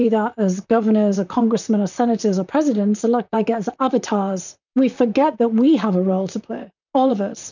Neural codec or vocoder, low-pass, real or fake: vocoder, 44.1 kHz, 128 mel bands, Pupu-Vocoder; 7.2 kHz; fake